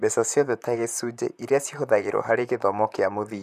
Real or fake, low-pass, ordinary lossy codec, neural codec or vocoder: fake; 14.4 kHz; none; vocoder, 44.1 kHz, 128 mel bands, Pupu-Vocoder